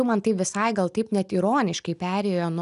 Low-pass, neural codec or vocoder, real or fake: 10.8 kHz; none; real